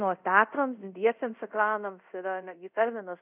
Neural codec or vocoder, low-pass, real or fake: codec, 24 kHz, 0.5 kbps, DualCodec; 3.6 kHz; fake